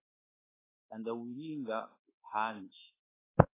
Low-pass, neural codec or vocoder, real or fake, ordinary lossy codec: 3.6 kHz; codec, 24 kHz, 1.2 kbps, DualCodec; fake; AAC, 16 kbps